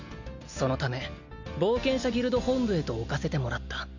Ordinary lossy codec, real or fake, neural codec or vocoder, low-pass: none; real; none; 7.2 kHz